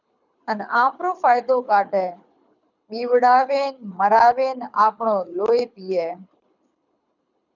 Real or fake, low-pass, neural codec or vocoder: fake; 7.2 kHz; codec, 24 kHz, 6 kbps, HILCodec